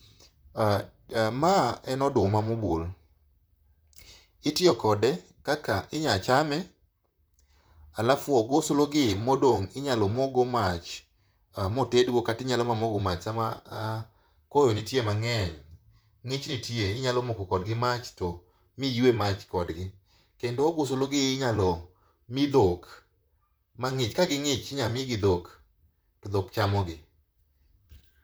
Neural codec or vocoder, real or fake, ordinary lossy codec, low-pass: vocoder, 44.1 kHz, 128 mel bands, Pupu-Vocoder; fake; none; none